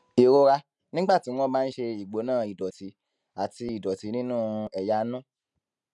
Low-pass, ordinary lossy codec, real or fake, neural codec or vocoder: 10.8 kHz; none; real; none